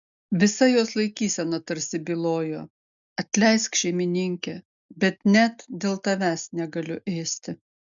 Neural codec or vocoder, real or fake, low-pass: none; real; 7.2 kHz